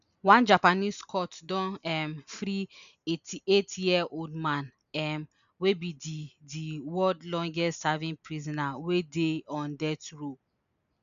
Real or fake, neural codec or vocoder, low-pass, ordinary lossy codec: real; none; 7.2 kHz; none